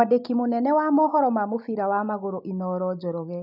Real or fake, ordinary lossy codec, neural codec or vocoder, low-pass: real; none; none; 5.4 kHz